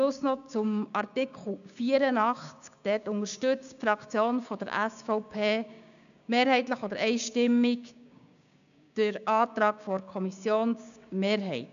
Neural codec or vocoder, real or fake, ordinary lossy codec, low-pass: codec, 16 kHz, 6 kbps, DAC; fake; none; 7.2 kHz